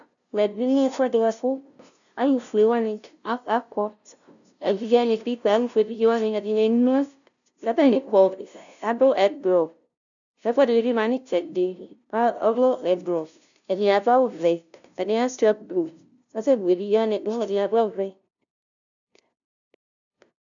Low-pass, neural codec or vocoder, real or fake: 7.2 kHz; codec, 16 kHz, 0.5 kbps, FunCodec, trained on LibriTTS, 25 frames a second; fake